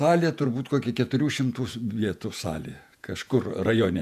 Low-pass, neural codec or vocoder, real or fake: 14.4 kHz; vocoder, 48 kHz, 128 mel bands, Vocos; fake